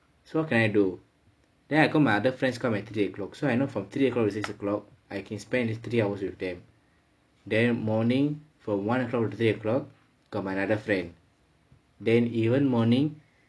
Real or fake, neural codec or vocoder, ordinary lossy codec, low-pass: real; none; none; none